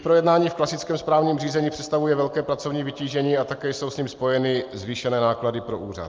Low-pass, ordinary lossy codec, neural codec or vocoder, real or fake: 7.2 kHz; Opus, 24 kbps; none; real